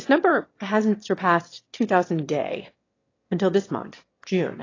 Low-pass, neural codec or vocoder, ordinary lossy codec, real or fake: 7.2 kHz; autoencoder, 22.05 kHz, a latent of 192 numbers a frame, VITS, trained on one speaker; AAC, 32 kbps; fake